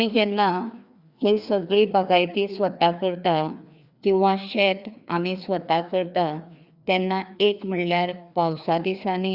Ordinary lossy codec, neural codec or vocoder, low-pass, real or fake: Opus, 64 kbps; codec, 16 kHz, 2 kbps, FreqCodec, larger model; 5.4 kHz; fake